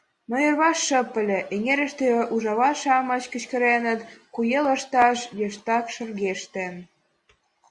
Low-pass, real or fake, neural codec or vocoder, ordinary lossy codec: 10.8 kHz; real; none; Opus, 64 kbps